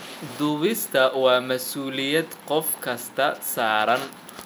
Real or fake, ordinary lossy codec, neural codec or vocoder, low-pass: fake; none; vocoder, 44.1 kHz, 128 mel bands every 256 samples, BigVGAN v2; none